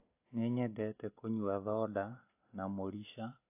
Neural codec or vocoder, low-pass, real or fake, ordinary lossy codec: none; 3.6 kHz; real; AAC, 24 kbps